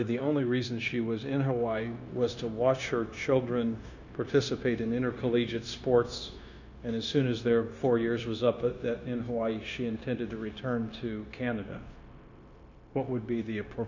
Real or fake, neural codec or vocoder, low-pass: fake; codec, 16 kHz, 0.9 kbps, LongCat-Audio-Codec; 7.2 kHz